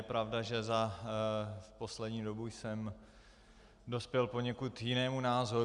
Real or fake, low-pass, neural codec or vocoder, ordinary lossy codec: real; 10.8 kHz; none; Opus, 64 kbps